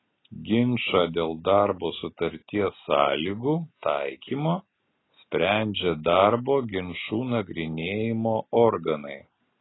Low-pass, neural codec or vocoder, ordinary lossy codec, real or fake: 7.2 kHz; none; AAC, 16 kbps; real